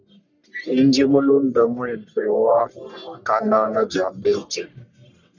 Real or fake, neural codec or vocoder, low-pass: fake; codec, 44.1 kHz, 1.7 kbps, Pupu-Codec; 7.2 kHz